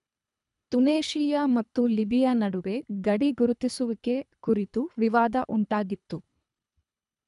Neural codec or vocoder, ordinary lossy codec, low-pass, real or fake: codec, 24 kHz, 3 kbps, HILCodec; none; 10.8 kHz; fake